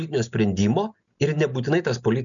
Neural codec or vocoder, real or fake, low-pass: none; real; 7.2 kHz